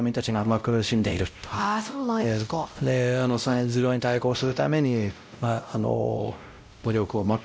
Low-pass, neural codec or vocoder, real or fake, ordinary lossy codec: none; codec, 16 kHz, 0.5 kbps, X-Codec, WavLM features, trained on Multilingual LibriSpeech; fake; none